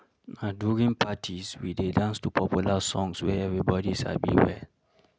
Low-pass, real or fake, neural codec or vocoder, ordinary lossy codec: none; real; none; none